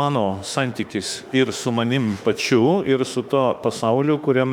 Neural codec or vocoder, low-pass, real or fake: autoencoder, 48 kHz, 32 numbers a frame, DAC-VAE, trained on Japanese speech; 19.8 kHz; fake